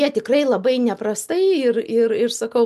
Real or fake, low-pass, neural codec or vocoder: real; 14.4 kHz; none